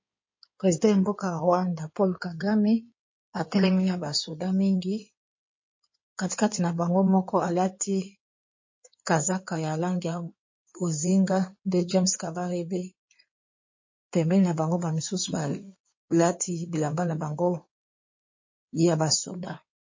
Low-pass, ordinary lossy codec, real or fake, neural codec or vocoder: 7.2 kHz; MP3, 32 kbps; fake; codec, 16 kHz in and 24 kHz out, 2.2 kbps, FireRedTTS-2 codec